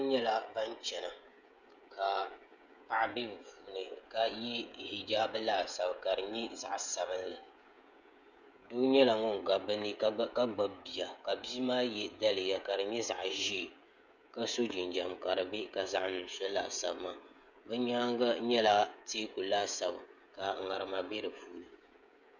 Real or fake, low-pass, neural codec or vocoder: fake; 7.2 kHz; codec, 16 kHz, 16 kbps, FreqCodec, smaller model